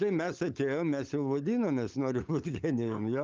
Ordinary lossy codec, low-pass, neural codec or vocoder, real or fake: Opus, 24 kbps; 7.2 kHz; codec, 16 kHz, 16 kbps, FunCodec, trained on LibriTTS, 50 frames a second; fake